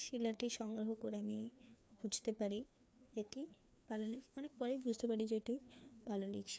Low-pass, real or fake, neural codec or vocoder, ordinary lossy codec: none; fake; codec, 16 kHz, 2 kbps, FreqCodec, larger model; none